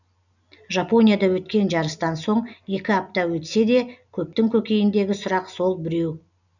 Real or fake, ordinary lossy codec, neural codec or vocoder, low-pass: real; none; none; 7.2 kHz